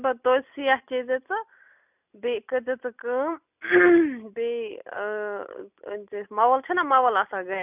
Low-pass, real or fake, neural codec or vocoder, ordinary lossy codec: 3.6 kHz; real; none; none